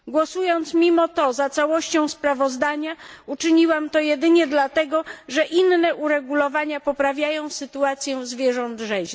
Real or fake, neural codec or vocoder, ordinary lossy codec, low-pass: real; none; none; none